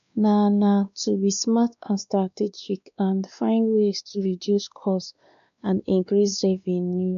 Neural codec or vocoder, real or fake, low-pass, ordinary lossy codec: codec, 16 kHz, 2 kbps, X-Codec, WavLM features, trained on Multilingual LibriSpeech; fake; 7.2 kHz; none